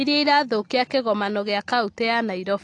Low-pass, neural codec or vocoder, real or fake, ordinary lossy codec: 10.8 kHz; none; real; AAC, 48 kbps